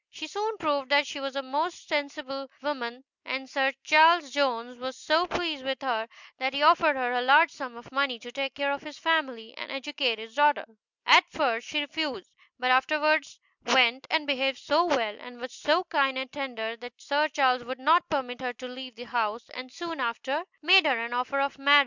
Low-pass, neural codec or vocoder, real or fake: 7.2 kHz; none; real